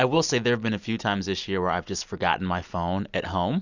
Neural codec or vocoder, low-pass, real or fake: none; 7.2 kHz; real